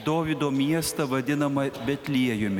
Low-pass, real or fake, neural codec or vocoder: 19.8 kHz; real; none